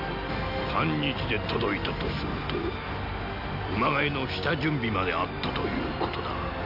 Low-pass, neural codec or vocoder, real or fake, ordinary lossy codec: 5.4 kHz; none; real; none